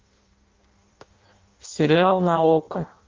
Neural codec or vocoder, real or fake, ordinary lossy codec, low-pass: codec, 16 kHz in and 24 kHz out, 0.6 kbps, FireRedTTS-2 codec; fake; Opus, 32 kbps; 7.2 kHz